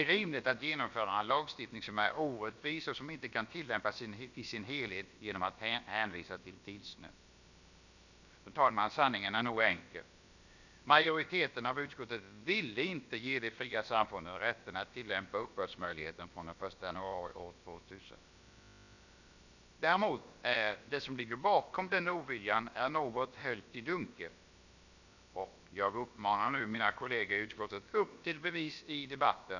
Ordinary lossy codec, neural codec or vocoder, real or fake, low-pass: none; codec, 16 kHz, about 1 kbps, DyCAST, with the encoder's durations; fake; 7.2 kHz